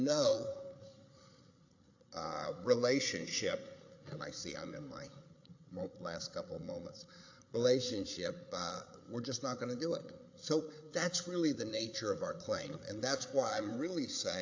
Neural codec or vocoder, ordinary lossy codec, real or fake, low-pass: codec, 16 kHz, 8 kbps, FreqCodec, larger model; AAC, 48 kbps; fake; 7.2 kHz